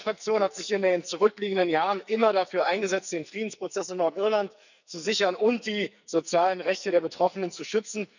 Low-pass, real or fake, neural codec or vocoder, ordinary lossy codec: 7.2 kHz; fake; codec, 44.1 kHz, 2.6 kbps, SNAC; none